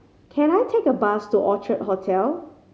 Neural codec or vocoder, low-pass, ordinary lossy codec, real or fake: none; none; none; real